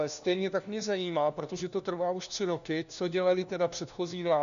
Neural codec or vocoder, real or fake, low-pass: codec, 16 kHz, 1 kbps, FunCodec, trained on LibriTTS, 50 frames a second; fake; 7.2 kHz